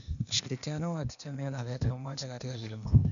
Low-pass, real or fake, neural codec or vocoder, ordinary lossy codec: 7.2 kHz; fake; codec, 16 kHz, 0.8 kbps, ZipCodec; none